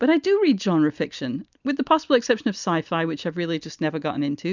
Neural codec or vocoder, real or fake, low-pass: none; real; 7.2 kHz